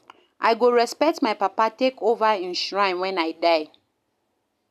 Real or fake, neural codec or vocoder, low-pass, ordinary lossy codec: real; none; 14.4 kHz; none